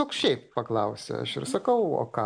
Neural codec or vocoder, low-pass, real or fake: none; 9.9 kHz; real